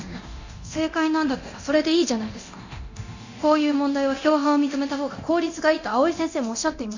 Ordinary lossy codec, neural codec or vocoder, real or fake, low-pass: none; codec, 24 kHz, 0.9 kbps, DualCodec; fake; 7.2 kHz